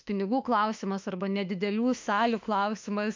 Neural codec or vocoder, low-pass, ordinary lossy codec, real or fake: autoencoder, 48 kHz, 32 numbers a frame, DAC-VAE, trained on Japanese speech; 7.2 kHz; MP3, 64 kbps; fake